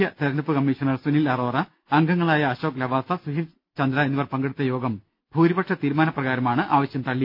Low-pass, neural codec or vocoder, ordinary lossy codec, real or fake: 5.4 kHz; none; none; real